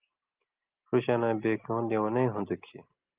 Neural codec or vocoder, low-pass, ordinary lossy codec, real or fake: none; 3.6 kHz; Opus, 32 kbps; real